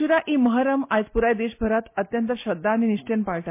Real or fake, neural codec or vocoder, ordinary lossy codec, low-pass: real; none; MP3, 24 kbps; 3.6 kHz